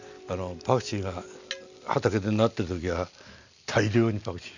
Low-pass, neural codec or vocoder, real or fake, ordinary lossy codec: 7.2 kHz; none; real; none